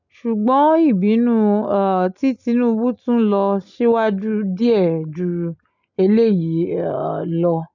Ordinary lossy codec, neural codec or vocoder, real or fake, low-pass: none; none; real; 7.2 kHz